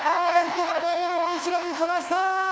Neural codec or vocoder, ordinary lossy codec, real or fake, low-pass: codec, 16 kHz, 1 kbps, FunCodec, trained on LibriTTS, 50 frames a second; none; fake; none